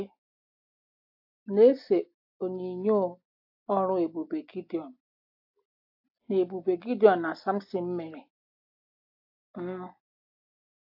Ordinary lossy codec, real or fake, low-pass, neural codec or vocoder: AAC, 48 kbps; real; 5.4 kHz; none